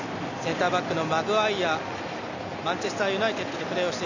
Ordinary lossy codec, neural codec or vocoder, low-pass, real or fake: none; none; 7.2 kHz; real